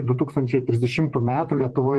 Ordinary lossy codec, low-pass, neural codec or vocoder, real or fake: Opus, 16 kbps; 10.8 kHz; vocoder, 44.1 kHz, 128 mel bands, Pupu-Vocoder; fake